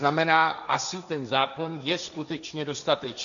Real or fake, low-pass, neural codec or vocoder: fake; 7.2 kHz; codec, 16 kHz, 1.1 kbps, Voila-Tokenizer